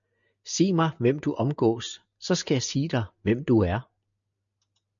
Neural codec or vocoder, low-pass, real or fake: none; 7.2 kHz; real